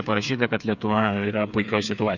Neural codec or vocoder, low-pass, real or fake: codec, 16 kHz, 8 kbps, FreqCodec, smaller model; 7.2 kHz; fake